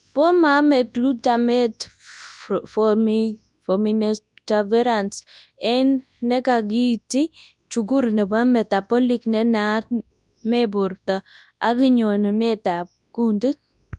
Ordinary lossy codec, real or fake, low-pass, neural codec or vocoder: none; fake; 10.8 kHz; codec, 24 kHz, 0.9 kbps, WavTokenizer, large speech release